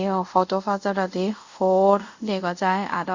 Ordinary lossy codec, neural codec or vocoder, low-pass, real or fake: Opus, 64 kbps; codec, 24 kHz, 0.5 kbps, DualCodec; 7.2 kHz; fake